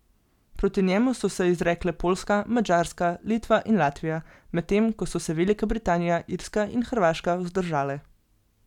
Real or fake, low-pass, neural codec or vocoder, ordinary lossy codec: real; 19.8 kHz; none; none